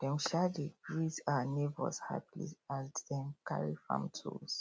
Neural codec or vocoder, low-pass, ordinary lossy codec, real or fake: none; none; none; real